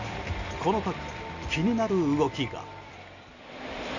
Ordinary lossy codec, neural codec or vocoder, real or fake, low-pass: none; none; real; 7.2 kHz